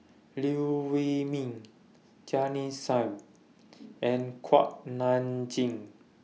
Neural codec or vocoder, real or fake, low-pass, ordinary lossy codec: none; real; none; none